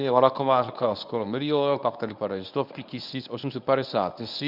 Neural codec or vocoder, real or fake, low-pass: codec, 24 kHz, 0.9 kbps, WavTokenizer, medium speech release version 1; fake; 5.4 kHz